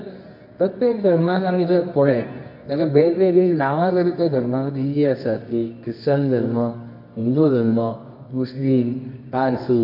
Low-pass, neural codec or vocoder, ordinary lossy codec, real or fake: 5.4 kHz; codec, 24 kHz, 0.9 kbps, WavTokenizer, medium music audio release; none; fake